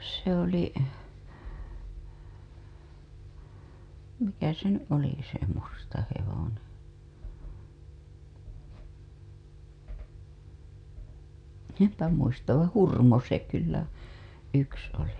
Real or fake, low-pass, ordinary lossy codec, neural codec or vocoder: real; 9.9 kHz; none; none